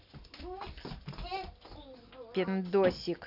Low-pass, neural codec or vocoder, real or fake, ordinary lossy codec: 5.4 kHz; none; real; none